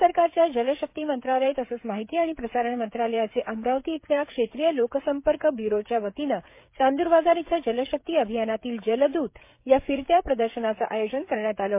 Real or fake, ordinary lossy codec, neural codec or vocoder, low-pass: fake; MP3, 24 kbps; codec, 16 kHz, 16 kbps, FreqCodec, smaller model; 3.6 kHz